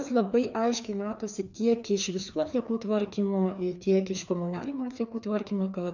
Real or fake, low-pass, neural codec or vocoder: fake; 7.2 kHz; codec, 16 kHz, 2 kbps, FreqCodec, larger model